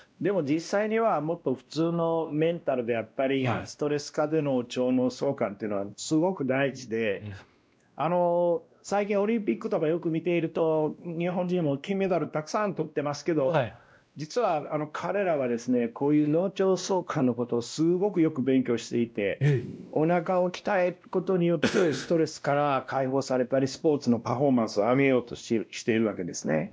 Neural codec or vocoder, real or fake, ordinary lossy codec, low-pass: codec, 16 kHz, 1 kbps, X-Codec, WavLM features, trained on Multilingual LibriSpeech; fake; none; none